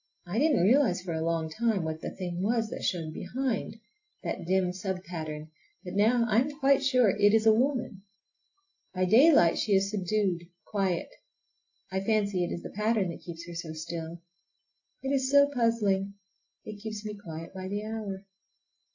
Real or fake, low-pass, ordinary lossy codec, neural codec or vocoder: real; 7.2 kHz; AAC, 48 kbps; none